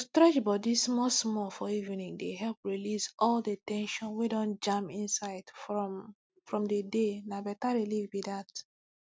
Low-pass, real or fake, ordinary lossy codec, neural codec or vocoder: none; real; none; none